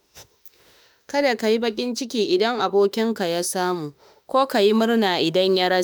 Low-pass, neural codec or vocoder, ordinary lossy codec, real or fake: none; autoencoder, 48 kHz, 32 numbers a frame, DAC-VAE, trained on Japanese speech; none; fake